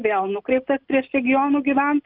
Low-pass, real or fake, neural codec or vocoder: 5.4 kHz; real; none